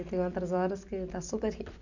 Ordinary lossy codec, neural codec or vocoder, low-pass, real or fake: none; none; 7.2 kHz; real